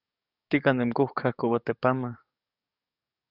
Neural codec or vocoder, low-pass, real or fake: codec, 44.1 kHz, 7.8 kbps, DAC; 5.4 kHz; fake